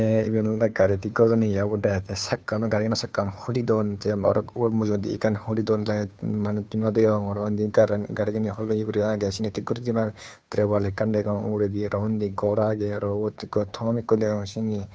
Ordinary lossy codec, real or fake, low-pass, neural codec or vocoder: none; fake; none; codec, 16 kHz, 2 kbps, FunCodec, trained on Chinese and English, 25 frames a second